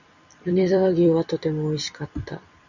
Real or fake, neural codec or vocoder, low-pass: fake; vocoder, 44.1 kHz, 128 mel bands every 256 samples, BigVGAN v2; 7.2 kHz